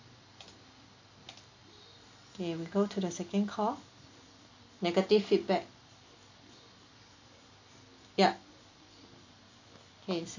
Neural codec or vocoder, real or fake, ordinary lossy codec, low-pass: none; real; AAC, 48 kbps; 7.2 kHz